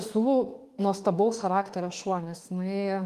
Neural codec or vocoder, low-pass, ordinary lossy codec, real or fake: autoencoder, 48 kHz, 32 numbers a frame, DAC-VAE, trained on Japanese speech; 14.4 kHz; Opus, 24 kbps; fake